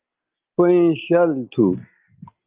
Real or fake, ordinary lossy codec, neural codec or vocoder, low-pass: real; Opus, 32 kbps; none; 3.6 kHz